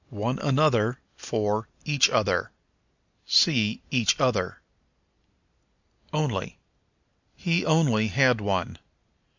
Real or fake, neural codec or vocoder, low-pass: real; none; 7.2 kHz